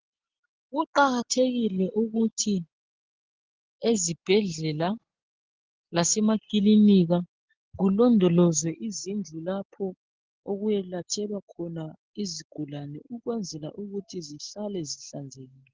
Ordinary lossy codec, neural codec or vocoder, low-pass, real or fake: Opus, 16 kbps; none; 7.2 kHz; real